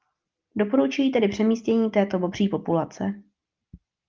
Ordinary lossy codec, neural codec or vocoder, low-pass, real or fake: Opus, 24 kbps; none; 7.2 kHz; real